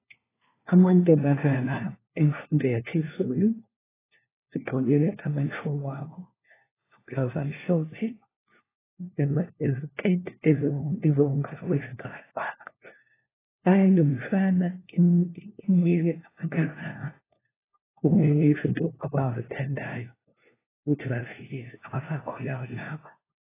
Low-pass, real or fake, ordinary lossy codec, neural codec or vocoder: 3.6 kHz; fake; AAC, 16 kbps; codec, 16 kHz, 1 kbps, FunCodec, trained on LibriTTS, 50 frames a second